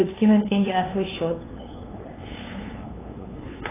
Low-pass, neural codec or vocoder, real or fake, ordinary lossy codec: 3.6 kHz; codec, 16 kHz, 2 kbps, FunCodec, trained on LibriTTS, 25 frames a second; fake; AAC, 16 kbps